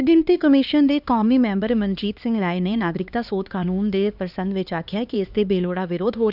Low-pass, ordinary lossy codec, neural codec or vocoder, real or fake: 5.4 kHz; none; codec, 16 kHz, 2 kbps, X-Codec, HuBERT features, trained on LibriSpeech; fake